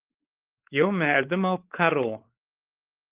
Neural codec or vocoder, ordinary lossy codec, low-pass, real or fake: codec, 16 kHz, 4 kbps, X-Codec, WavLM features, trained on Multilingual LibriSpeech; Opus, 16 kbps; 3.6 kHz; fake